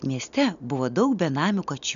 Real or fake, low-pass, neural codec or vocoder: real; 7.2 kHz; none